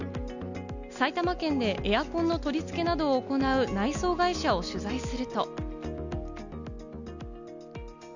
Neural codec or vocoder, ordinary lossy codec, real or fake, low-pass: none; none; real; 7.2 kHz